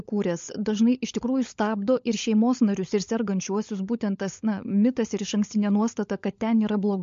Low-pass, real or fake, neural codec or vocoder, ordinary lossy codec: 7.2 kHz; fake; codec, 16 kHz, 16 kbps, FunCodec, trained on LibriTTS, 50 frames a second; MP3, 48 kbps